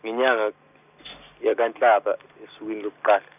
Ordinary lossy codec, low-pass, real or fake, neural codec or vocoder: none; 3.6 kHz; real; none